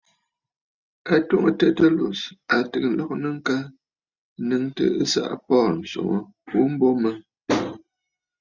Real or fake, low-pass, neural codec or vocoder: real; 7.2 kHz; none